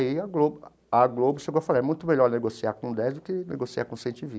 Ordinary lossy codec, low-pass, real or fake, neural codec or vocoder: none; none; real; none